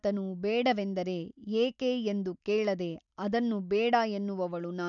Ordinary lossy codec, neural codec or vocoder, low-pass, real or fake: none; none; 7.2 kHz; real